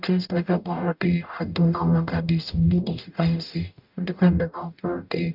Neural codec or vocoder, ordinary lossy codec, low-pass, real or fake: codec, 44.1 kHz, 0.9 kbps, DAC; none; 5.4 kHz; fake